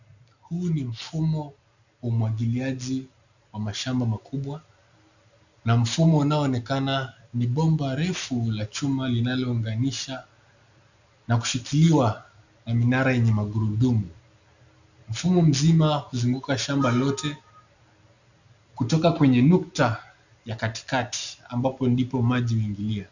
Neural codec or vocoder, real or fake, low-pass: none; real; 7.2 kHz